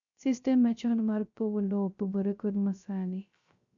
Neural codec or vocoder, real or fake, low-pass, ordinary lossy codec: codec, 16 kHz, 0.3 kbps, FocalCodec; fake; 7.2 kHz; none